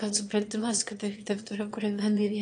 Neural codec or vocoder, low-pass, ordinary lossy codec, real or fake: autoencoder, 22.05 kHz, a latent of 192 numbers a frame, VITS, trained on one speaker; 9.9 kHz; AAC, 64 kbps; fake